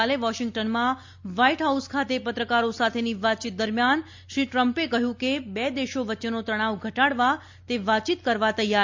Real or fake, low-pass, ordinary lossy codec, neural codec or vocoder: real; 7.2 kHz; MP3, 48 kbps; none